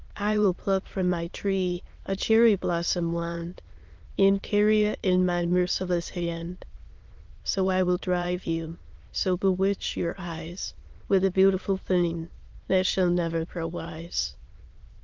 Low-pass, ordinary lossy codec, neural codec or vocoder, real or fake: 7.2 kHz; Opus, 32 kbps; autoencoder, 22.05 kHz, a latent of 192 numbers a frame, VITS, trained on many speakers; fake